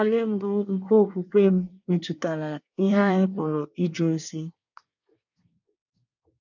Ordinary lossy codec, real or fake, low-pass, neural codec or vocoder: none; fake; 7.2 kHz; codec, 24 kHz, 1 kbps, SNAC